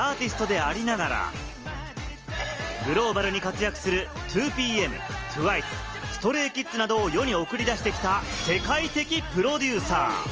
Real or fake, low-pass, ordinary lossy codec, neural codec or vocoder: real; 7.2 kHz; Opus, 24 kbps; none